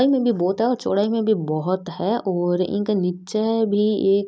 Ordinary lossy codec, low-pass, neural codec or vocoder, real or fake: none; none; none; real